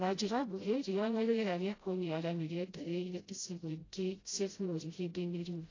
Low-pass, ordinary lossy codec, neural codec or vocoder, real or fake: 7.2 kHz; AAC, 32 kbps; codec, 16 kHz, 0.5 kbps, FreqCodec, smaller model; fake